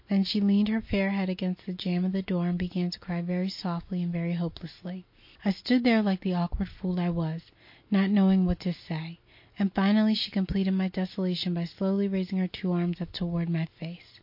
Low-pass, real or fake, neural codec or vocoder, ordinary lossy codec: 5.4 kHz; real; none; MP3, 32 kbps